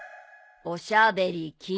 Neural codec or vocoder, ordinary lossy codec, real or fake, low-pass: none; none; real; none